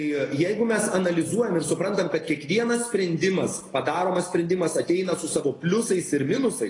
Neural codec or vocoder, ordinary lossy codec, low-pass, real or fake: none; AAC, 32 kbps; 10.8 kHz; real